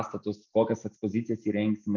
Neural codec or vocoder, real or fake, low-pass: none; real; 7.2 kHz